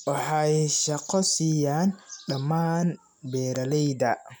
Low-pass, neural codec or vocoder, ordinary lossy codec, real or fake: none; none; none; real